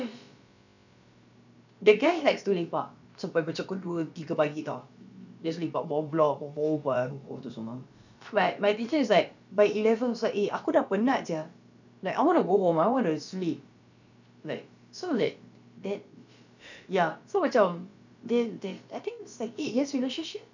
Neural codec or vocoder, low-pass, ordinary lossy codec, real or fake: codec, 16 kHz, about 1 kbps, DyCAST, with the encoder's durations; 7.2 kHz; none; fake